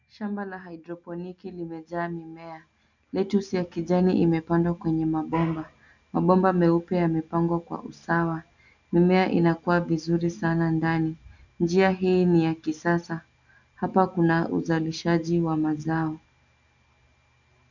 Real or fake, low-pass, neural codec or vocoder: real; 7.2 kHz; none